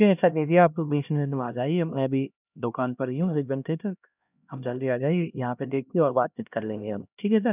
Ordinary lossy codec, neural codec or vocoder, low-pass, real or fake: none; codec, 16 kHz, 1 kbps, X-Codec, HuBERT features, trained on LibriSpeech; 3.6 kHz; fake